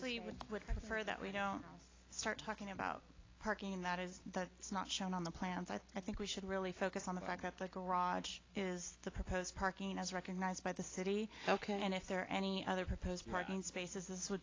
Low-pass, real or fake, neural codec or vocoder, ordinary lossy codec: 7.2 kHz; real; none; AAC, 32 kbps